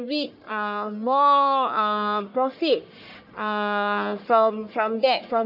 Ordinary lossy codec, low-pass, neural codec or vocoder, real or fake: none; 5.4 kHz; codec, 44.1 kHz, 1.7 kbps, Pupu-Codec; fake